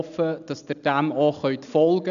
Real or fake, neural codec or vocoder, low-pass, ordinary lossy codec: real; none; 7.2 kHz; none